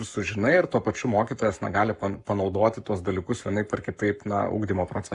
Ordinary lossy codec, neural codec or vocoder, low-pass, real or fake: Opus, 64 kbps; codec, 44.1 kHz, 7.8 kbps, Pupu-Codec; 10.8 kHz; fake